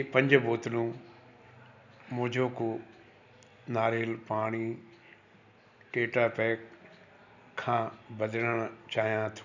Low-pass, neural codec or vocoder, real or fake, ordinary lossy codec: 7.2 kHz; none; real; none